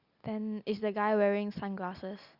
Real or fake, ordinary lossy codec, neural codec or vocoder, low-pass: real; Opus, 64 kbps; none; 5.4 kHz